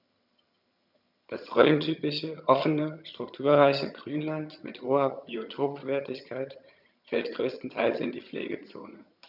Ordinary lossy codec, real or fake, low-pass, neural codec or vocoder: none; fake; 5.4 kHz; vocoder, 22.05 kHz, 80 mel bands, HiFi-GAN